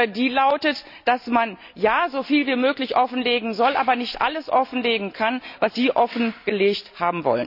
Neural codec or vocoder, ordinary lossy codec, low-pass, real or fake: none; none; 5.4 kHz; real